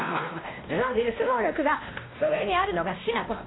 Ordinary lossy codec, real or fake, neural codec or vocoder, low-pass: AAC, 16 kbps; fake; codec, 16 kHz, 1 kbps, X-Codec, WavLM features, trained on Multilingual LibriSpeech; 7.2 kHz